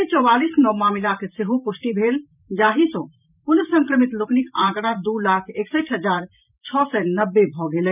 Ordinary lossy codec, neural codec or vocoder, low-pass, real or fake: none; vocoder, 44.1 kHz, 128 mel bands every 512 samples, BigVGAN v2; 3.6 kHz; fake